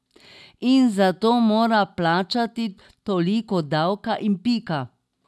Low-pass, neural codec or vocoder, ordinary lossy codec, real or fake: none; none; none; real